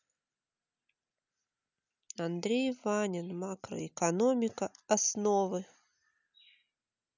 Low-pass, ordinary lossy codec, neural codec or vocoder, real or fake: 7.2 kHz; none; none; real